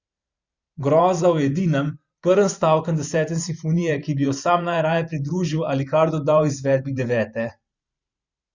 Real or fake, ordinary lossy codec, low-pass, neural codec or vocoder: fake; Opus, 64 kbps; 7.2 kHz; vocoder, 44.1 kHz, 128 mel bands every 512 samples, BigVGAN v2